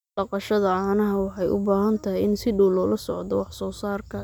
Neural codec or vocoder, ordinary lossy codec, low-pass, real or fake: none; none; none; real